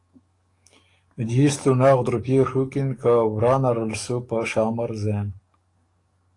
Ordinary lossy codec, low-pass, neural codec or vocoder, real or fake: AAC, 48 kbps; 10.8 kHz; codec, 44.1 kHz, 7.8 kbps, DAC; fake